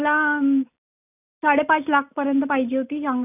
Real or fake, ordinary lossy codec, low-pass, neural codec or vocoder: real; none; 3.6 kHz; none